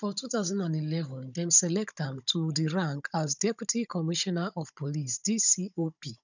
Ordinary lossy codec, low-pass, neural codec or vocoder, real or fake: none; 7.2 kHz; codec, 16 kHz, 16 kbps, FunCodec, trained on Chinese and English, 50 frames a second; fake